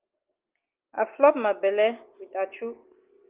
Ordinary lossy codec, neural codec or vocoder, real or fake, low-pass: Opus, 32 kbps; none; real; 3.6 kHz